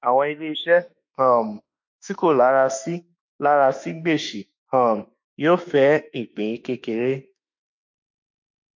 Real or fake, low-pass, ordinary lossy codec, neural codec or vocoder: fake; 7.2 kHz; MP3, 48 kbps; autoencoder, 48 kHz, 32 numbers a frame, DAC-VAE, trained on Japanese speech